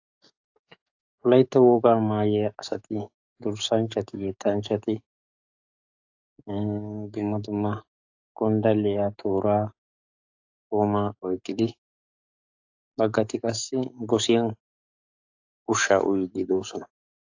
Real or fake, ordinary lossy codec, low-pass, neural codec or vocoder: fake; AAC, 48 kbps; 7.2 kHz; codec, 44.1 kHz, 7.8 kbps, DAC